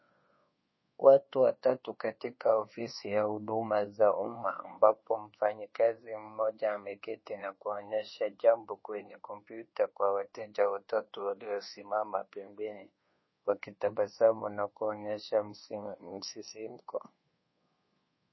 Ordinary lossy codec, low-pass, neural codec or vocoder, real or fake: MP3, 24 kbps; 7.2 kHz; codec, 24 kHz, 1.2 kbps, DualCodec; fake